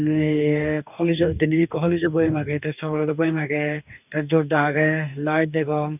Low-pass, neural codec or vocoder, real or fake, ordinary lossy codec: 3.6 kHz; codec, 44.1 kHz, 2.6 kbps, DAC; fake; none